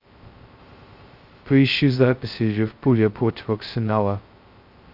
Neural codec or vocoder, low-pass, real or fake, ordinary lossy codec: codec, 16 kHz, 0.2 kbps, FocalCodec; 5.4 kHz; fake; Opus, 24 kbps